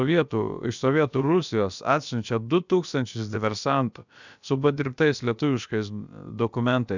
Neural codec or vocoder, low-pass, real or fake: codec, 16 kHz, about 1 kbps, DyCAST, with the encoder's durations; 7.2 kHz; fake